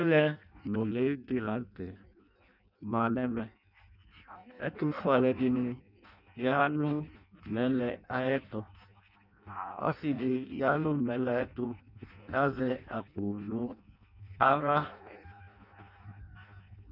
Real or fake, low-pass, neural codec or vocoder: fake; 5.4 kHz; codec, 16 kHz in and 24 kHz out, 0.6 kbps, FireRedTTS-2 codec